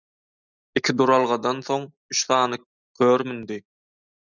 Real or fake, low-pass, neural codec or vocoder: real; 7.2 kHz; none